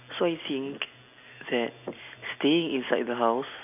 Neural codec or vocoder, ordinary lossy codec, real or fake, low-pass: none; none; real; 3.6 kHz